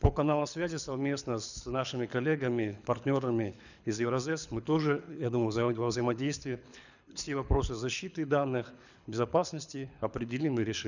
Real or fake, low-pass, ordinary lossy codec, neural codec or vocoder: fake; 7.2 kHz; none; codec, 24 kHz, 6 kbps, HILCodec